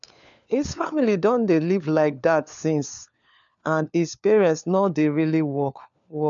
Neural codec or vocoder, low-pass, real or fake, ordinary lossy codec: codec, 16 kHz, 4 kbps, FunCodec, trained on LibriTTS, 50 frames a second; 7.2 kHz; fake; none